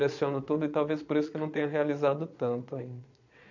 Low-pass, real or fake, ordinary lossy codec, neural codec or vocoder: 7.2 kHz; fake; none; vocoder, 22.05 kHz, 80 mel bands, Vocos